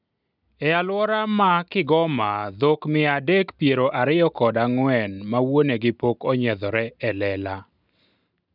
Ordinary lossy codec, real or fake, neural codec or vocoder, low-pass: none; real; none; 5.4 kHz